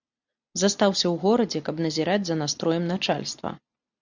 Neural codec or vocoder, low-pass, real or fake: none; 7.2 kHz; real